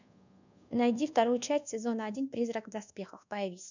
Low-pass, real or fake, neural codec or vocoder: 7.2 kHz; fake; codec, 24 kHz, 1.2 kbps, DualCodec